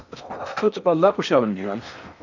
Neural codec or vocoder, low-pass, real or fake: codec, 16 kHz in and 24 kHz out, 0.8 kbps, FocalCodec, streaming, 65536 codes; 7.2 kHz; fake